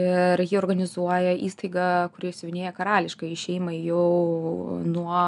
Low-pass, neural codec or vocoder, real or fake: 10.8 kHz; none; real